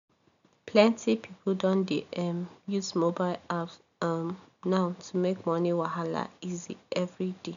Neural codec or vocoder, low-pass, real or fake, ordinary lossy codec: none; 7.2 kHz; real; none